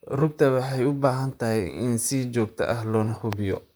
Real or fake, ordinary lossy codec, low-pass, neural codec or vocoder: fake; none; none; vocoder, 44.1 kHz, 128 mel bands, Pupu-Vocoder